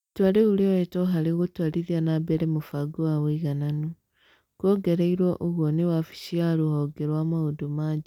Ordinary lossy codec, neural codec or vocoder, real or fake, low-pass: none; none; real; 19.8 kHz